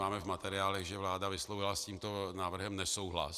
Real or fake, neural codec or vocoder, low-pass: real; none; 14.4 kHz